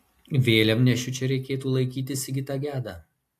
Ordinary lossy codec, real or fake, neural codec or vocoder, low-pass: AAC, 64 kbps; real; none; 14.4 kHz